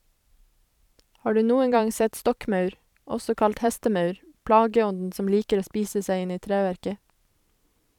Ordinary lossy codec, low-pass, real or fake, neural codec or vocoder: none; 19.8 kHz; fake; vocoder, 44.1 kHz, 128 mel bands every 512 samples, BigVGAN v2